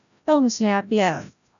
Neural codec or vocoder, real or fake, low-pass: codec, 16 kHz, 0.5 kbps, FreqCodec, larger model; fake; 7.2 kHz